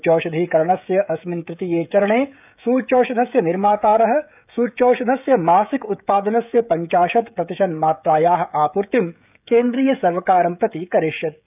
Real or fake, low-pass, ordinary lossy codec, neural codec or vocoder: fake; 3.6 kHz; none; codec, 16 kHz, 16 kbps, FreqCodec, smaller model